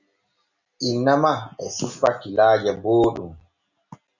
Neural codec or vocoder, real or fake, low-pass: none; real; 7.2 kHz